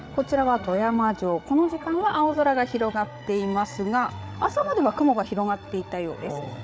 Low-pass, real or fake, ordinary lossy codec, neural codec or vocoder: none; fake; none; codec, 16 kHz, 8 kbps, FreqCodec, larger model